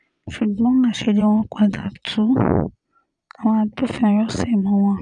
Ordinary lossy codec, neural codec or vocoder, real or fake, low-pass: none; vocoder, 22.05 kHz, 80 mel bands, Vocos; fake; 9.9 kHz